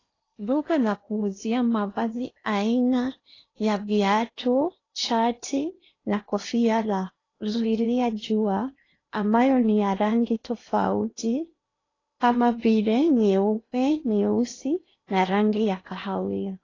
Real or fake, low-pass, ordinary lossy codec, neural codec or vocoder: fake; 7.2 kHz; AAC, 32 kbps; codec, 16 kHz in and 24 kHz out, 0.8 kbps, FocalCodec, streaming, 65536 codes